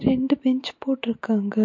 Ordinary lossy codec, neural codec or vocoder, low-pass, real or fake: MP3, 48 kbps; none; 7.2 kHz; real